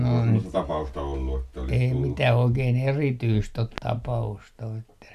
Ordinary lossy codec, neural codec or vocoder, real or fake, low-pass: none; none; real; 14.4 kHz